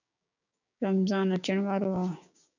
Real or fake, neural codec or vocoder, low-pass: fake; codec, 16 kHz, 6 kbps, DAC; 7.2 kHz